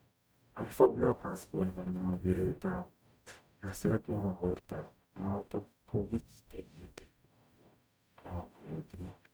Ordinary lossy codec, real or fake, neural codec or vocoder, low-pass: none; fake; codec, 44.1 kHz, 0.9 kbps, DAC; none